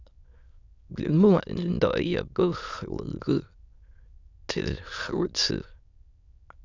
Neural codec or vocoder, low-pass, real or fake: autoencoder, 22.05 kHz, a latent of 192 numbers a frame, VITS, trained on many speakers; 7.2 kHz; fake